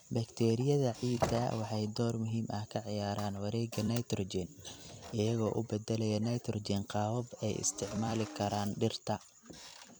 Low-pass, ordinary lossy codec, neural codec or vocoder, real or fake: none; none; vocoder, 44.1 kHz, 128 mel bands every 256 samples, BigVGAN v2; fake